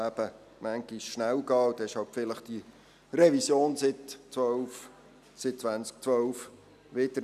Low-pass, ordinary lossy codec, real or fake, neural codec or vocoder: 14.4 kHz; none; real; none